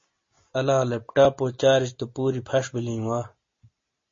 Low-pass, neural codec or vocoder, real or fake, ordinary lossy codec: 7.2 kHz; none; real; MP3, 32 kbps